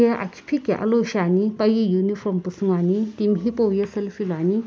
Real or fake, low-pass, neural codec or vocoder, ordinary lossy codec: real; none; none; none